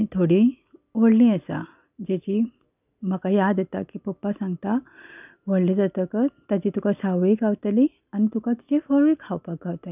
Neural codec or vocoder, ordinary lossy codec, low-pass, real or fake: none; none; 3.6 kHz; real